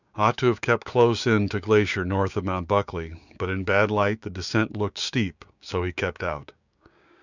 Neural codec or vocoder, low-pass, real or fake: codec, 16 kHz, 6 kbps, DAC; 7.2 kHz; fake